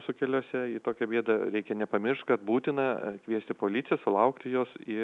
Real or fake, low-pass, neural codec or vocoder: real; 10.8 kHz; none